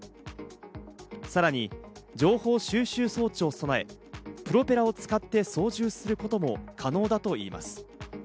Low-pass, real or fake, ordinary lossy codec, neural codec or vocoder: none; real; none; none